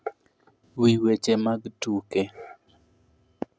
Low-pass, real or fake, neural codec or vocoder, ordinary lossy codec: none; real; none; none